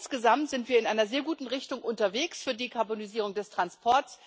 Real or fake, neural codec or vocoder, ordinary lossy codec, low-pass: real; none; none; none